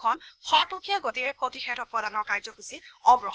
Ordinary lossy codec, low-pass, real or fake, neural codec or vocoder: none; none; fake; codec, 16 kHz, 0.8 kbps, ZipCodec